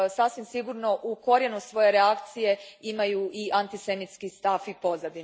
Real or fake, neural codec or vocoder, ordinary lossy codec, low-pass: real; none; none; none